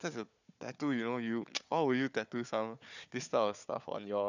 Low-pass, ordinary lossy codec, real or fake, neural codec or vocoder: 7.2 kHz; none; fake; codec, 16 kHz, 4 kbps, FunCodec, trained on Chinese and English, 50 frames a second